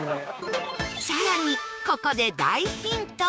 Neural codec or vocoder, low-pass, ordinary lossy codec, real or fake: codec, 16 kHz, 6 kbps, DAC; none; none; fake